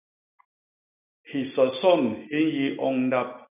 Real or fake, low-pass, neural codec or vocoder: real; 3.6 kHz; none